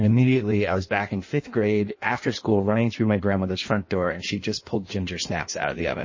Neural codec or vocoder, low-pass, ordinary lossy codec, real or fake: codec, 16 kHz in and 24 kHz out, 1.1 kbps, FireRedTTS-2 codec; 7.2 kHz; MP3, 32 kbps; fake